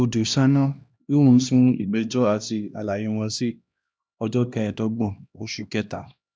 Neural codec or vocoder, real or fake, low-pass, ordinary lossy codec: codec, 16 kHz, 1 kbps, X-Codec, HuBERT features, trained on LibriSpeech; fake; none; none